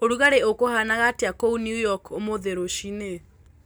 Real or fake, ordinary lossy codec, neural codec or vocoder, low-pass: real; none; none; none